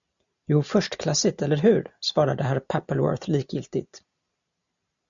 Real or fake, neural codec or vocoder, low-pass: real; none; 7.2 kHz